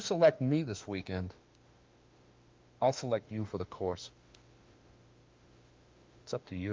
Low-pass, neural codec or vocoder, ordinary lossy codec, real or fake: 7.2 kHz; autoencoder, 48 kHz, 32 numbers a frame, DAC-VAE, trained on Japanese speech; Opus, 24 kbps; fake